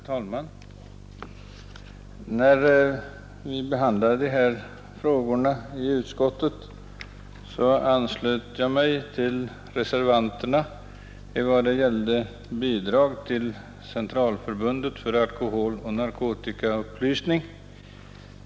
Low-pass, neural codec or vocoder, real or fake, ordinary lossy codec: none; none; real; none